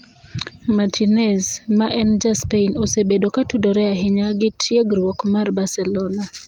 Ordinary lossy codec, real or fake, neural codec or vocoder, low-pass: Opus, 32 kbps; real; none; 19.8 kHz